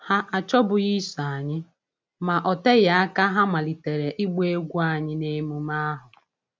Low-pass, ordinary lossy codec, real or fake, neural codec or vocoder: none; none; real; none